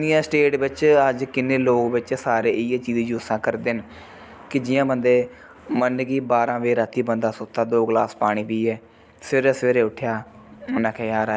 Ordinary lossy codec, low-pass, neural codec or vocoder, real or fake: none; none; none; real